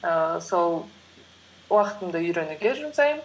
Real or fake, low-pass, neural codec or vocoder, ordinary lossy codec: real; none; none; none